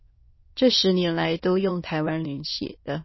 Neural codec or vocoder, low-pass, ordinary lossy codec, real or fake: autoencoder, 22.05 kHz, a latent of 192 numbers a frame, VITS, trained on many speakers; 7.2 kHz; MP3, 24 kbps; fake